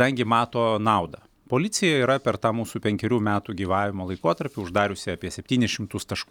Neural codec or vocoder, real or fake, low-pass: none; real; 19.8 kHz